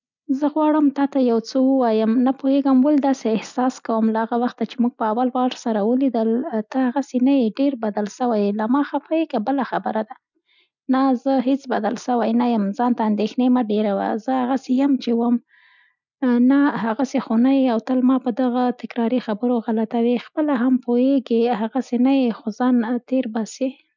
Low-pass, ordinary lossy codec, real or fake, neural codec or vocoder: 7.2 kHz; none; real; none